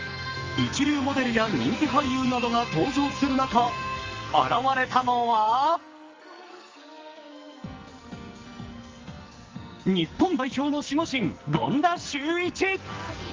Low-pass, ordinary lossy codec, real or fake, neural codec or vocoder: 7.2 kHz; Opus, 32 kbps; fake; codec, 44.1 kHz, 2.6 kbps, SNAC